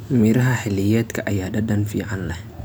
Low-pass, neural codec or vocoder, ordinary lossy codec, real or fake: none; none; none; real